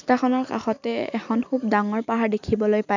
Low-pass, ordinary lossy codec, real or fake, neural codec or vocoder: 7.2 kHz; none; real; none